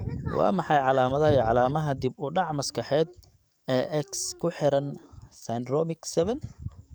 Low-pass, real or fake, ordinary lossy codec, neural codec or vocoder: none; fake; none; codec, 44.1 kHz, 7.8 kbps, DAC